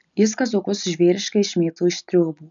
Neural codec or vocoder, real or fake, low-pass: none; real; 7.2 kHz